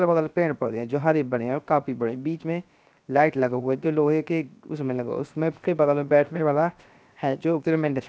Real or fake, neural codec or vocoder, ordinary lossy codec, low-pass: fake; codec, 16 kHz, 0.7 kbps, FocalCodec; none; none